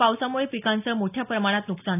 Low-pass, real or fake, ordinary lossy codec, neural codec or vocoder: 3.6 kHz; real; none; none